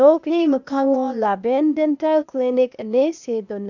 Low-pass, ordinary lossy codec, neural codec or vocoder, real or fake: 7.2 kHz; none; codec, 16 kHz, 0.8 kbps, ZipCodec; fake